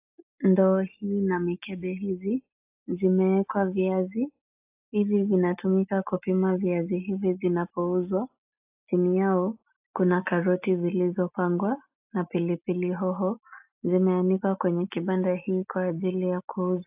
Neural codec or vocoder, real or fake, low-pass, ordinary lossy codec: none; real; 3.6 kHz; AAC, 32 kbps